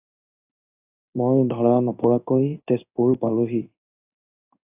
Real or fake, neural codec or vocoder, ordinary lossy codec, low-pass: fake; codec, 16 kHz in and 24 kHz out, 1 kbps, XY-Tokenizer; AAC, 32 kbps; 3.6 kHz